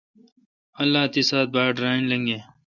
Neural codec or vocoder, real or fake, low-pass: none; real; 7.2 kHz